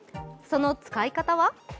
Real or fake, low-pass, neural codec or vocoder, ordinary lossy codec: real; none; none; none